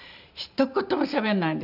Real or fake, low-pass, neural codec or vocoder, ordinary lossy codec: fake; 5.4 kHz; vocoder, 44.1 kHz, 128 mel bands every 512 samples, BigVGAN v2; none